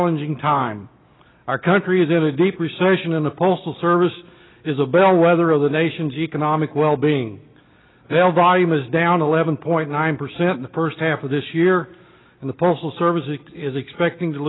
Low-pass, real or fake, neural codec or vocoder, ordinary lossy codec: 7.2 kHz; real; none; AAC, 16 kbps